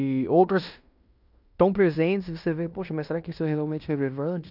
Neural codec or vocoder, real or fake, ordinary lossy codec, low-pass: codec, 16 kHz in and 24 kHz out, 0.9 kbps, LongCat-Audio-Codec, fine tuned four codebook decoder; fake; none; 5.4 kHz